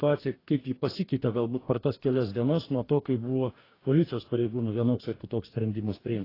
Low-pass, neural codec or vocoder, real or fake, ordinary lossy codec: 5.4 kHz; codec, 44.1 kHz, 2.6 kbps, DAC; fake; AAC, 24 kbps